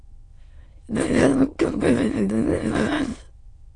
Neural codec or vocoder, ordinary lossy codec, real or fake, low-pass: autoencoder, 22.05 kHz, a latent of 192 numbers a frame, VITS, trained on many speakers; AAC, 32 kbps; fake; 9.9 kHz